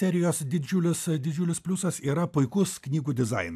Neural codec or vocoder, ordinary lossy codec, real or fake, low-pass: none; AAC, 96 kbps; real; 14.4 kHz